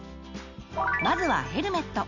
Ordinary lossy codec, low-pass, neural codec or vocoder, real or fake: none; 7.2 kHz; none; real